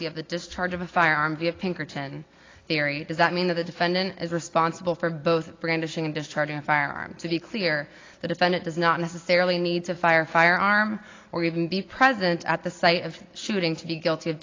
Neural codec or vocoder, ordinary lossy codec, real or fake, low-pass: none; AAC, 32 kbps; real; 7.2 kHz